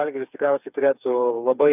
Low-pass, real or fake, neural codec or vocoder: 3.6 kHz; fake; codec, 16 kHz, 4 kbps, FreqCodec, smaller model